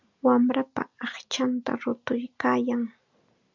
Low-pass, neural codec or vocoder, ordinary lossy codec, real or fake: 7.2 kHz; none; MP3, 64 kbps; real